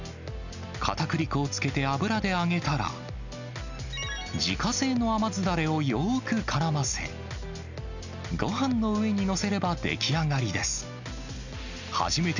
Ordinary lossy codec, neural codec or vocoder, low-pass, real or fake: none; none; 7.2 kHz; real